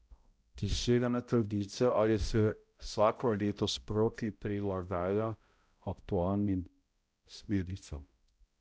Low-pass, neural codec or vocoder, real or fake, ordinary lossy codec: none; codec, 16 kHz, 0.5 kbps, X-Codec, HuBERT features, trained on balanced general audio; fake; none